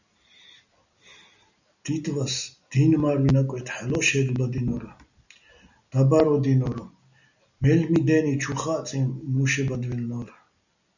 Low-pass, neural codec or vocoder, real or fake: 7.2 kHz; none; real